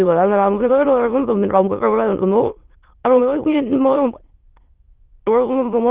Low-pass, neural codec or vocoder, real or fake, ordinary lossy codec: 3.6 kHz; autoencoder, 22.05 kHz, a latent of 192 numbers a frame, VITS, trained on many speakers; fake; Opus, 16 kbps